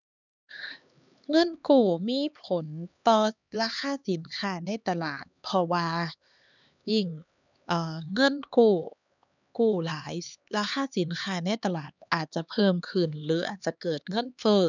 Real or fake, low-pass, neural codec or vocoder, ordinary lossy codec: fake; 7.2 kHz; codec, 16 kHz, 2 kbps, X-Codec, HuBERT features, trained on LibriSpeech; none